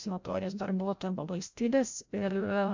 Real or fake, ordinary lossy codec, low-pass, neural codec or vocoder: fake; MP3, 64 kbps; 7.2 kHz; codec, 16 kHz, 0.5 kbps, FreqCodec, larger model